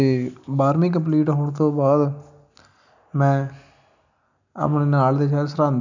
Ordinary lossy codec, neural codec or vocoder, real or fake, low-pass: none; none; real; 7.2 kHz